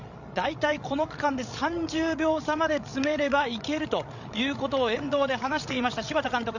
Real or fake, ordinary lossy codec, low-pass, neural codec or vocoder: fake; none; 7.2 kHz; codec, 16 kHz, 16 kbps, FreqCodec, larger model